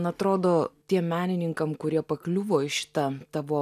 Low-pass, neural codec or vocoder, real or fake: 14.4 kHz; none; real